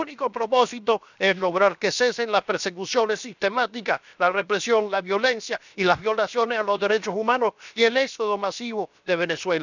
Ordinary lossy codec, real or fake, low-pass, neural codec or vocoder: none; fake; 7.2 kHz; codec, 16 kHz, 0.7 kbps, FocalCodec